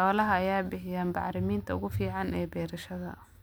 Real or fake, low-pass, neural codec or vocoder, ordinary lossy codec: real; none; none; none